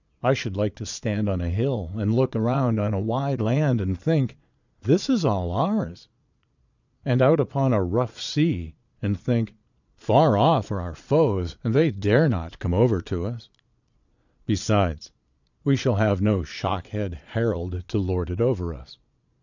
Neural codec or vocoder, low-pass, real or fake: vocoder, 22.05 kHz, 80 mel bands, Vocos; 7.2 kHz; fake